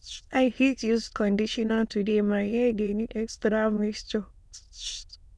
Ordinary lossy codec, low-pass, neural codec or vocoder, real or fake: none; none; autoencoder, 22.05 kHz, a latent of 192 numbers a frame, VITS, trained on many speakers; fake